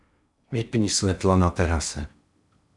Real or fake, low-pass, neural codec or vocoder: fake; 10.8 kHz; codec, 16 kHz in and 24 kHz out, 0.8 kbps, FocalCodec, streaming, 65536 codes